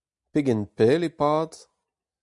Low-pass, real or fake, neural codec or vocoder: 10.8 kHz; real; none